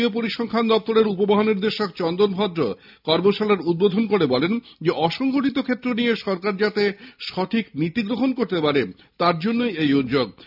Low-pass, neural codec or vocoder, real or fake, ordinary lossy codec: 5.4 kHz; none; real; none